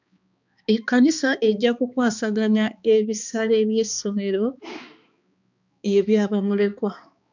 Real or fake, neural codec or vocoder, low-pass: fake; codec, 16 kHz, 2 kbps, X-Codec, HuBERT features, trained on balanced general audio; 7.2 kHz